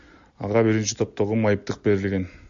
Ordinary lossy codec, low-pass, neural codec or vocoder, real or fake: MP3, 48 kbps; 7.2 kHz; none; real